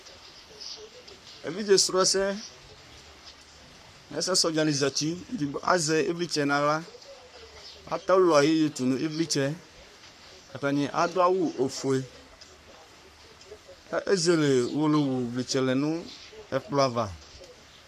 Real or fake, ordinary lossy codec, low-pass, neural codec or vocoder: fake; MP3, 96 kbps; 14.4 kHz; codec, 44.1 kHz, 3.4 kbps, Pupu-Codec